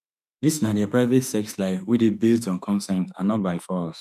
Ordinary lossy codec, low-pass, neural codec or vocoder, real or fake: none; 14.4 kHz; autoencoder, 48 kHz, 32 numbers a frame, DAC-VAE, trained on Japanese speech; fake